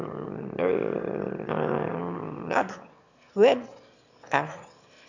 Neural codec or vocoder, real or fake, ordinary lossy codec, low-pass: autoencoder, 22.05 kHz, a latent of 192 numbers a frame, VITS, trained on one speaker; fake; none; 7.2 kHz